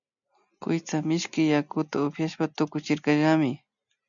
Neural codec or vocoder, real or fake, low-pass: none; real; 7.2 kHz